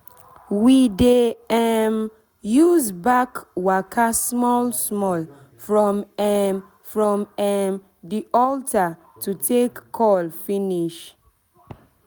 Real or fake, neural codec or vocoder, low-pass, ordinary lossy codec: real; none; none; none